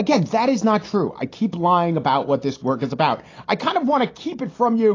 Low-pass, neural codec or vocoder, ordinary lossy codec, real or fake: 7.2 kHz; none; AAC, 32 kbps; real